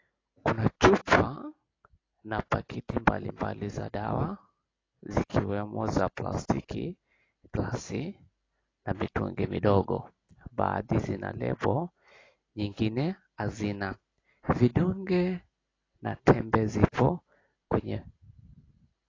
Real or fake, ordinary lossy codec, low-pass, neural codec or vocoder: real; AAC, 32 kbps; 7.2 kHz; none